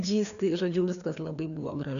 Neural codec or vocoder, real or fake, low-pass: codec, 16 kHz, 2 kbps, FreqCodec, larger model; fake; 7.2 kHz